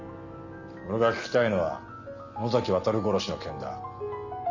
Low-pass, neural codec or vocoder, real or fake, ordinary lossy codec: 7.2 kHz; none; real; none